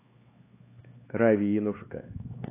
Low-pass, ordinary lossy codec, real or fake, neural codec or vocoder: 3.6 kHz; AAC, 24 kbps; fake; codec, 16 kHz, 4 kbps, X-Codec, HuBERT features, trained on LibriSpeech